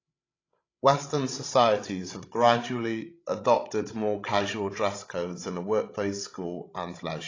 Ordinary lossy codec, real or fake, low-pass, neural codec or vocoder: AAC, 32 kbps; fake; 7.2 kHz; codec, 16 kHz, 16 kbps, FreqCodec, larger model